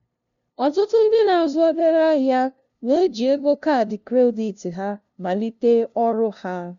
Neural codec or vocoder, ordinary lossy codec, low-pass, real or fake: codec, 16 kHz, 0.5 kbps, FunCodec, trained on LibriTTS, 25 frames a second; none; 7.2 kHz; fake